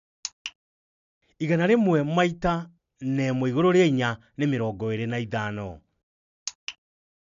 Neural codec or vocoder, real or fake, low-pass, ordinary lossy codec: none; real; 7.2 kHz; none